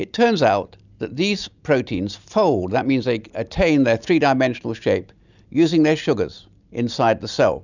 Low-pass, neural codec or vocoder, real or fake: 7.2 kHz; none; real